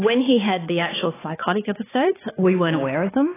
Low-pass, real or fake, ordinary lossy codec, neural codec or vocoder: 3.6 kHz; fake; AAC, 16 kbps; codec, 16 kHz, 4 kbps, X-Codec, HuBERT features, trained on balanced general audio